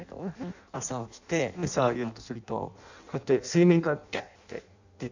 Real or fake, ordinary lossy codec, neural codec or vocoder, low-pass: fake; none; codec, 16 kHz in and 24 kHz out, 0.6 kbps, FireRedTTS-2 codec; 7.2 kHz